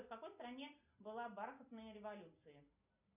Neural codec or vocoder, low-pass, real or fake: none; 3.6 kHz; real